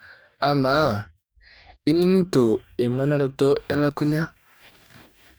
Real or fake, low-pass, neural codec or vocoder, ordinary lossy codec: fake; none; codec, 44.1 kHz, 2.6 kbps, DAC; none